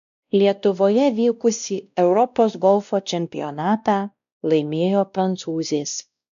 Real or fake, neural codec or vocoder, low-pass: fake; codec, 16 kHz, 1 kbps, X-Codec, WavLM features, trained on Multilingual LibriSpeech; 7.2 kHz